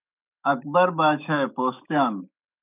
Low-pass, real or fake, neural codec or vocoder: 3.6 kHz; fake; autoencoder, 48 kHz, 128 numbers a frame, DAC-VAE, trained on Japanese speech